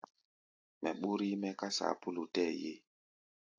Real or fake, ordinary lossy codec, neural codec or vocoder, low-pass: real; AAC, 48 kbps; none; 7.2 kHz